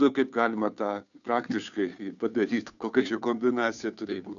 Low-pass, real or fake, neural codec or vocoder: 7.2 kHz; fake; codec, 16 kHz, 2 kbps, FunCodec, trained on Chinese and English, 25 frames a second